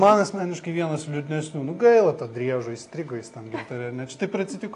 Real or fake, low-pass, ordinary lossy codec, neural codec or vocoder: real; 10.8 kHz; AAC, 48 kbps; none